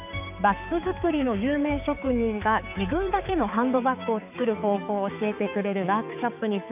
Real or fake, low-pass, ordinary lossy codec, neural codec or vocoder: fake; 3.6 kHz; none; codec, 16 kHz, 4 kbps, X-Codec, HuBERT features, trained on balanced general audio